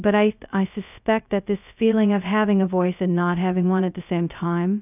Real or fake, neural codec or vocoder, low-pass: fake; codec, 16 kHz, 0.2 kbps, FocalCodec; 3.6 kHz